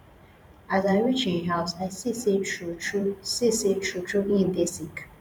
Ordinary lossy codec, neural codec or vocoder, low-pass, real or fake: none; vocoder, 44.1 kHz, 128 mel bands every 512 samples, BigVGAN v2; 19.8 kHz; fake